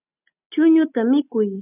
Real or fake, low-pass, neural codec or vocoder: real; 3.6 kHz; none